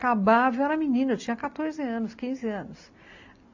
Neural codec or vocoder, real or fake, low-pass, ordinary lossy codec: none; real; 7.2 kHz; AAC, 48 kbps